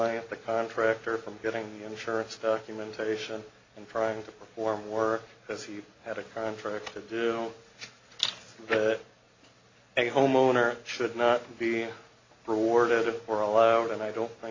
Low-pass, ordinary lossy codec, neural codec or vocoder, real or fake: 7.2 kHz; AAC, 32 kbps; none; real